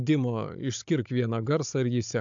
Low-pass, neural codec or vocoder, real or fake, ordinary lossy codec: 7.2 kHz; codec, 16 kHz, 16 kbps, FunCodec, trained on Chinese and English, 50 frames a second; fake; AAC, 64 kbps